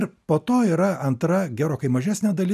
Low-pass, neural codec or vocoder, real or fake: 14.4 kHz; none; real